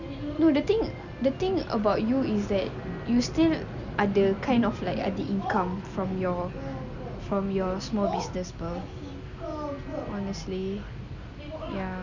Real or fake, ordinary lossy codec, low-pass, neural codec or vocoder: real; none; 7.2 kHz; none